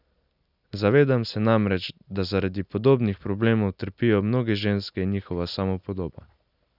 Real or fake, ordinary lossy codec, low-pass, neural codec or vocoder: real; none; 5.4 kHz; none